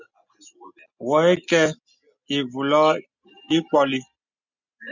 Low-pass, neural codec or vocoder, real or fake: 7.2 kHz; none; real